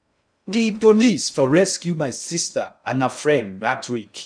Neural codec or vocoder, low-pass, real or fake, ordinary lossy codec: codec, 16 kHz in and 24 kHz out, 0.6 kbps, FocalCodec, streaming, 2048 codes; 9.9 kHz; fake; none